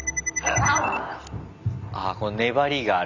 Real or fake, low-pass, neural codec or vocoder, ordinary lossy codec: real; 7.2 kHz; none; none